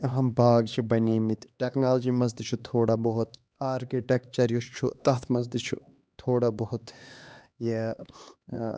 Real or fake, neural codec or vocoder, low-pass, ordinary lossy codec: fake; codec, 16 kHz, 2 kbps, X-Codec, HuBERT features, trained on LibriSpeech; none; none